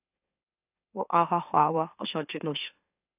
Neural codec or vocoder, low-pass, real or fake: autoencoder, 44.1 kHz, a latent of 192 numbers a frame, MeloTTS; 3.6 kHz; fake